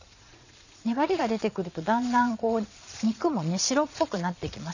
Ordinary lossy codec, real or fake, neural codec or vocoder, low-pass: none; fake; vocoder, 22.05 kHz, 80 mel bands, Vocos; 7.2 kHz